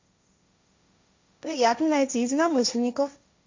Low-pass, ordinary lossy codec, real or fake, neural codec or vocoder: 7.2 kHz; MP3, 64 kbps; fake; codec, 16 kHz, 1.1 kbps, Voila-Tokenizer